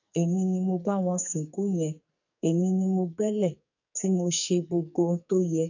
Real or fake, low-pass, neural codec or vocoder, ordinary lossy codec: fake; 7.2 kHz; codec, 44.1 kHz, 2.6 kbps, SNAC; none